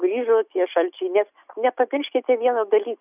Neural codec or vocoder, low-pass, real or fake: none; 3.6 kHz; real